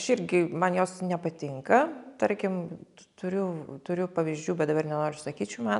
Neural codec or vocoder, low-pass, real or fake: none; 10.8 kHz; real